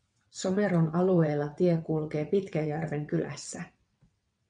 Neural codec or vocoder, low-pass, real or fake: vocoder, 22.05 kHz, 80 mel bands, WaveNeXt; 9.9 kHz; fake